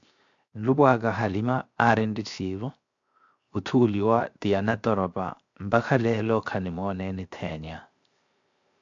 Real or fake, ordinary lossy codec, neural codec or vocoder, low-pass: fake; AAC, 64 kbps; codec, 16 kHz, 0.7 kbps, FocalCodec; 7.2 kHz